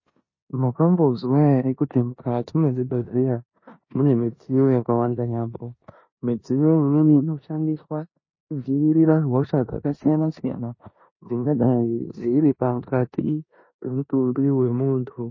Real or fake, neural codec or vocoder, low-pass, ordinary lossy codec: fake; codec, 16 kHz in and 24 kHz out, 0.9 kbps, LongCat-Audio-Codec, four codebook decoder; 7.2 kHz; MP3, 32 kbps